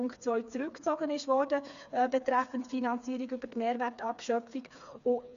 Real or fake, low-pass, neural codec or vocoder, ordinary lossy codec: fake; 7.2 kHz; codec, 16 kHz, 4 kbps, FreqCodec, smaller model; none